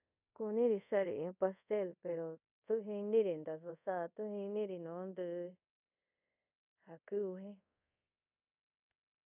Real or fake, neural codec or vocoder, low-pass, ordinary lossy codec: fake; codec, 24 kHz, 0.5 kbps, DualCodec; 3.6 kHz; none